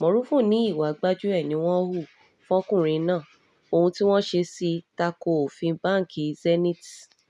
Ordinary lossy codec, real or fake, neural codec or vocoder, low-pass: none; real; none; none